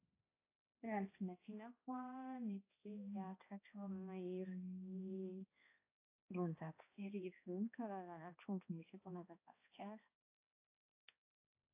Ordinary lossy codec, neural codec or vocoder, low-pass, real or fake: AAC, 24 kbps; codec, 16 kHz, 1 kbps, X-Codec, HuBERT features, trained on balanced general audio; 3.6 kHz; fake